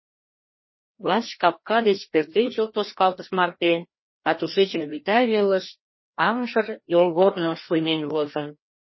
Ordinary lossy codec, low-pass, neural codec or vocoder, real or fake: MP3, 24 kbps; 7.2 kHz; codec, 16 kHz, 1 kbps, FreqCodec, larger model; fake